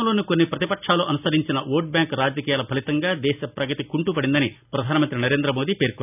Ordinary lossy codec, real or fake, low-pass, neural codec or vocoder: none; real; 3.6 kHz; none